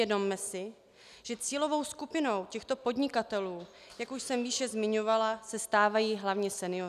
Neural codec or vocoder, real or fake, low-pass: none; real; 14.4 kHz